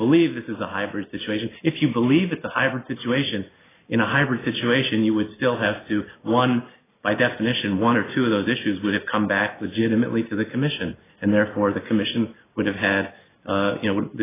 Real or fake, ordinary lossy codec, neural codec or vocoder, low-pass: real; AAC, 16 kbps; none; 3.6 kHz